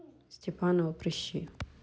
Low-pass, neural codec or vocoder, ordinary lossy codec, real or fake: none; none; none; real